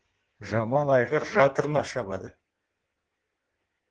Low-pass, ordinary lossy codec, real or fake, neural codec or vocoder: 9.9 kHz; Opus, 16 kbps; fake; codec, 16 kHz in and 24 kHz out, 1.1 kbps, FireRedTTS-2 codec